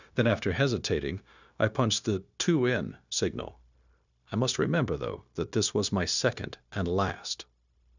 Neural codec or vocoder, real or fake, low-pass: codec, 16 kHz, 0.9 kbps, LongCat-Audio-Codec; fake; 7.2 kHz